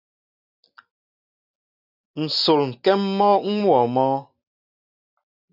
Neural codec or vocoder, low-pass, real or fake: none; 5.4 kHz; real